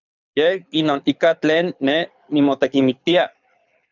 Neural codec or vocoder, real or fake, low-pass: codec, 24 kHz, 6 kbps, HILCodec; fake; 7.2 kHz